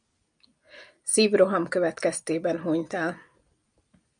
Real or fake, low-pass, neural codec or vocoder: real; 9.9 kHz; none